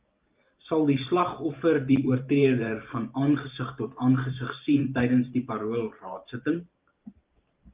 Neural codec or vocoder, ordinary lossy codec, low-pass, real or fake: vocoder, 44.1 kHz, 128 mel bands every 512 samples, BigVGAN v2; Opus, 32 kbps; 3.6 kHz; fake